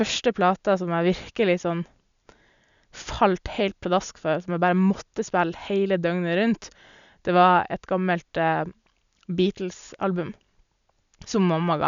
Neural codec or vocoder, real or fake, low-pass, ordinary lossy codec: none; real; 7.2 kHz; none